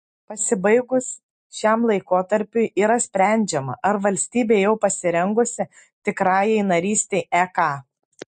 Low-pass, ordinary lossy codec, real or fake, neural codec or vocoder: 10.8 kHz; MP3, 48 kbps; real; none